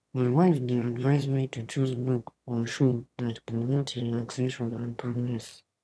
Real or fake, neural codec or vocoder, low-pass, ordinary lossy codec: fake; autoencoder, 22.05 kHz, a latent of 192 numbers a frame, VITS, trained on one speaker; none; none